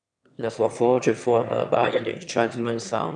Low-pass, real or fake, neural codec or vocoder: 9.9 kHz; fake; autoencoder, 22.05 kHz, a latent of 192 numbers a frame, VITS, trained on one speaker